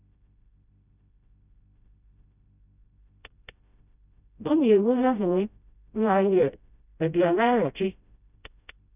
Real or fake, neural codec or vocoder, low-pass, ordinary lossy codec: fake; codec, 16 kHz, 0.5 kbps, FreqCodec, smaller model; 3.6 kHz; none